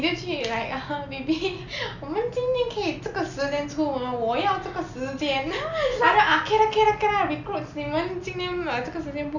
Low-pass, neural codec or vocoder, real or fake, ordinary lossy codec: 7.2 kHz; none; real; none